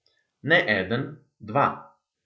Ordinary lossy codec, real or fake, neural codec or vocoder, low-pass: none; real; none; none